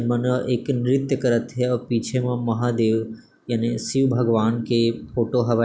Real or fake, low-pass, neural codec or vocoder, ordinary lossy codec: real; none; none; none